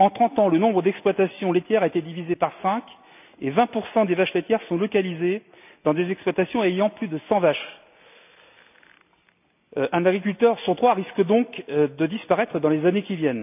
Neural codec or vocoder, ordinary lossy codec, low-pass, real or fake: none; none; 3.6 kHz; real